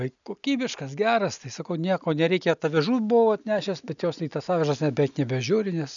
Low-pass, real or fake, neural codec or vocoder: 7.2 kHz; real; none